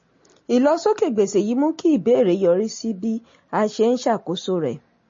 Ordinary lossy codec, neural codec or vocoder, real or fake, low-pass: MP3, 32 kbps; none; real; 7.2 kHz